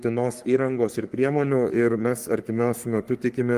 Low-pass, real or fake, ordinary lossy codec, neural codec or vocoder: 14.4 kHz; fake; Opus, 24 kbps; codec, 44.1 kHz, 3.4 kbps, Pupu-Codec